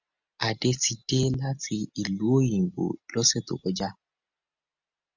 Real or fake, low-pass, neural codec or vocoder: real; 7.2 kHz; none